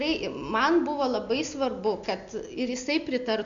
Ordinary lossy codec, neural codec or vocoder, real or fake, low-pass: Opus, 64 kbps; none; real; 7.2 kHz